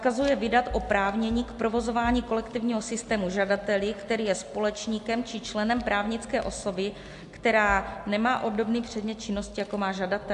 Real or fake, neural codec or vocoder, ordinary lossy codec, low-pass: real; none; AAC, 64 kbps; 10.8 kHz